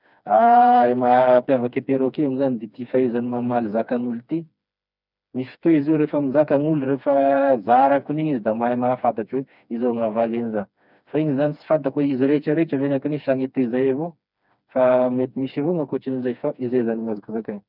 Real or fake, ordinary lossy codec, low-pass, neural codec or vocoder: fake; none; 5.4 kHz; codec, 16 kHz, 2 kbps, FreqCodec, smaller model